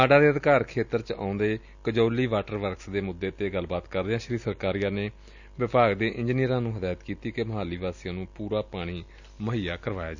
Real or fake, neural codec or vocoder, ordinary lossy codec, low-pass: real; none; none; 7.2 kHz